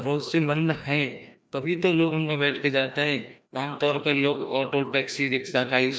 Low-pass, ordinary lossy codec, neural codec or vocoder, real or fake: none; none; codec, 16 kHz, 1 kbps, FreqCodec, larger model; fake